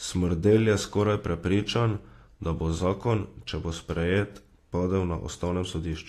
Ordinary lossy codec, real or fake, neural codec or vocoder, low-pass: AAC, 48 kbps; real; none; 14.4 kHz